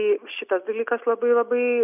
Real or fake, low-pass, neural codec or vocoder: real; 3.6 kHz; none